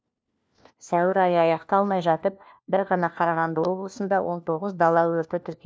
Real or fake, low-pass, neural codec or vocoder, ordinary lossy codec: fake; none; codec, 16 kHz, 1 kbps, FunCodec, trained on LibriTTS, 50 frames a second; none